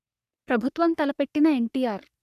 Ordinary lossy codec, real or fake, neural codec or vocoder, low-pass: none; fake; codec, 44.1 kHz, 3.4 kbps, Pupu-Codec; 14.4 kHz